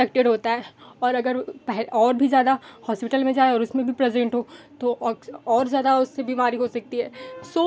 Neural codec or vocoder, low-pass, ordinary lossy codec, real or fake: none; none; none; real